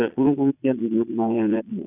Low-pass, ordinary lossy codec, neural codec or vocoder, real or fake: 3.6 kHz; none; vocoder, 22.05 kHz, 80 mel bands, Vocos; fake